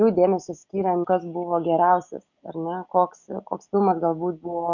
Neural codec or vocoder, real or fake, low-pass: none; real; 7.2 kHz